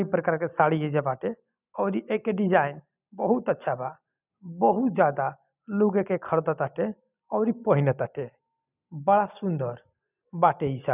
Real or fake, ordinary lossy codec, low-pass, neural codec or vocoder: real; none; 3.6 kHz; none